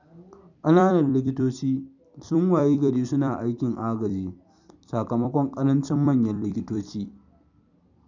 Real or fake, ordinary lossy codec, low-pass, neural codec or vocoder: fake; none; 7.2 kHz; vocoder, 44.1 kHz, 128 mel bands every 256 samples, BigVGAN v2